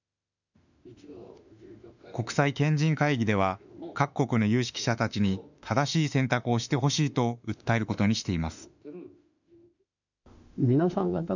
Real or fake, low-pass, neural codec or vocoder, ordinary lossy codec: fake; 7.2 kHz; autoencoder, 48 kHz, 32 numbers a frame, DAC-VAE, trained on Japanese speech; none